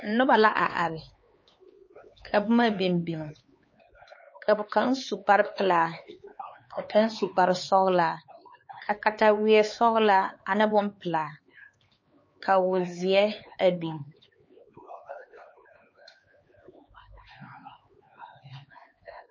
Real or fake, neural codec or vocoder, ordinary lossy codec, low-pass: fake; codec, 16 kHz, 4 kbps, X-Codec, HuBERT features, trained on LibriSpeech; MP3, 32 kbps; 7.2 kHz